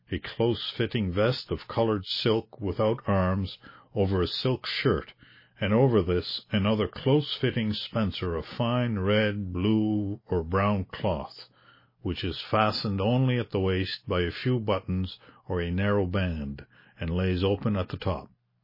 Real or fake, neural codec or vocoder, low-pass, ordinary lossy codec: real; none; 5.4 kHz; MP3, 24 kbps